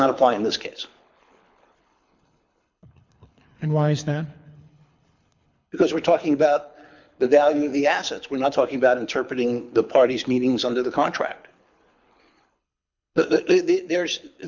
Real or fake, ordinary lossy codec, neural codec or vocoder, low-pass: fake; MP3, 64 kbps; codec, 24 kHz, 6 kbps, HILCodec; 7.2 kHz